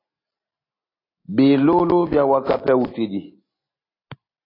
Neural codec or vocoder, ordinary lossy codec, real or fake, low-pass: none; AAC, 24 kbps; real; 5.4 kHz